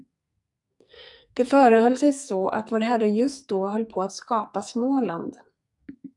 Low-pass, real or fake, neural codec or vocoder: 10.8 kHz; fake; codec, 44.1 kHz, 2.6 kbps, SNAC